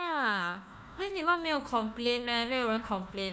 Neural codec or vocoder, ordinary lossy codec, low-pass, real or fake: codec, 16 kHz, 1 kbps, FunCodec, trained on Chinese and English, 50 frames a second; none; none; fake